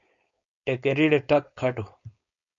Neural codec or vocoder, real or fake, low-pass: codec, 16 kHz, 4.8 kbps, FACodec; fake; 7.2 kHz